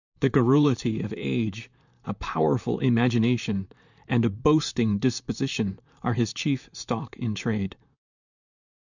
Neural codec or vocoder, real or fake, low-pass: vocoder, 44.1 kHz, 128 mel bands, Pupu-Vocoder; fake; 7.2 kHz